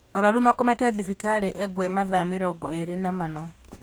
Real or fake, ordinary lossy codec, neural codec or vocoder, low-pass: fake; none; codec, 44.1 kHz, 2.6 kbps, DAC; none